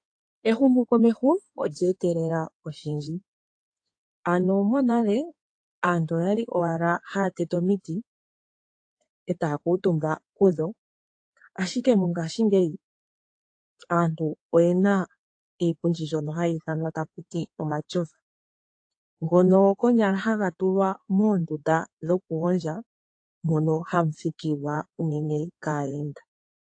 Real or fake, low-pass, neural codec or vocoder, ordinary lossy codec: fake; 9.9 kHz; codec, 16 kHz in and 24 kHz out, 2.2 kbps, FireRedTTS-2 codec; AAC, 48 kbps